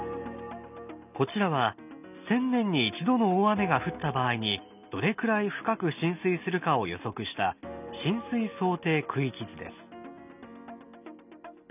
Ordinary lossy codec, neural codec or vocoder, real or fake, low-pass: none; none; real; 3.6 kHz